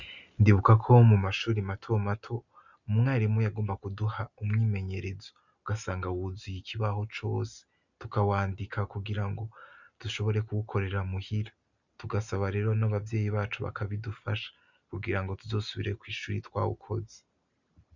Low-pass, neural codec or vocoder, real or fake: 7.2 kHz; none; real